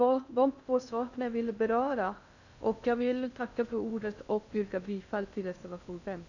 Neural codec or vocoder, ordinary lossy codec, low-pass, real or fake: codec, 16 kHz in and 24 kHz out, 0.6 kbps, FocalCodec, streaming, 2048 codes; none; 7.2 kHz; fake